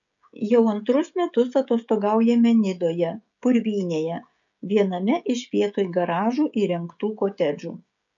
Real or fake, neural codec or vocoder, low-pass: fake; codec, 16 kHz, 16 kbps, FreqCodec, smaller model; 7.2 kHz